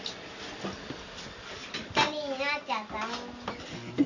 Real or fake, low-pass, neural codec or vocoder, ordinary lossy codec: real; 7.2 kHz; none; none